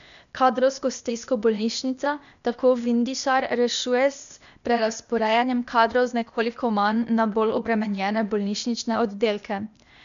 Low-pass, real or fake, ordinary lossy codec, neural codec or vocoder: 7.2 kHz; fake; none; codec, 16 kHz, 0.8 kbps, ZipCodec